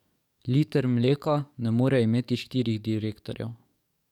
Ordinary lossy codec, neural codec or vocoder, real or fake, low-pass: none; codec, 44.1 kHz, 7.8 kbps, DAC; fake; 19.8 kHz